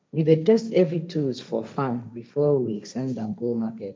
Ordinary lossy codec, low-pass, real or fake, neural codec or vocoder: none; none; fake; codec, 16 kHz, 1.1 kbps, Voila-Tokenizer